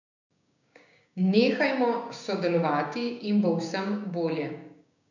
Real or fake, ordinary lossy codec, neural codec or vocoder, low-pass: fake; none; codec, 16 kHz, 6 kbps, DAC; 7.2 kHz